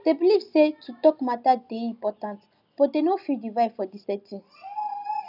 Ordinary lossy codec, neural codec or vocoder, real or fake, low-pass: none; none; real; 5.4 kHz